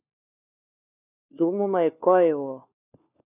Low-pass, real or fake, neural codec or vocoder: 3.6 kHz; fake; codec, 16 kHz, 4 kbps, FunCodec, trained on LibriTTS, 50 frames a second